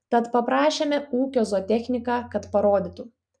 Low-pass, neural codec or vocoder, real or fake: 9.9 kHz; none; real